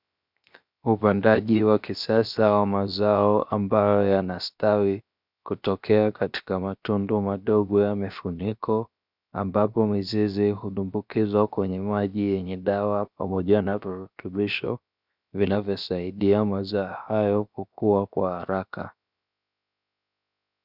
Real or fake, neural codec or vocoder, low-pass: fake; codec, 16 kHz, 0.7 kbps, FocalCodec; 5.4 kHz